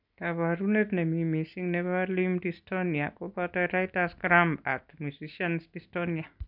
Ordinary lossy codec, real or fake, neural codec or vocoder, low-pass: none; real; none; 5.4 kHz